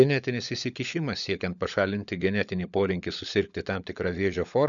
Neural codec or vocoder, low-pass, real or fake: codec, 16 kHz, 16 kbps, FunCodec, trained on LibriTTS, 50 frames a second; 7.2 kHz; fake